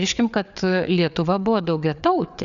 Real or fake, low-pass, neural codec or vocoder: fake; 7.2 kHz; codec, 16 kHz, 4 kbps, FreqCodec, larger model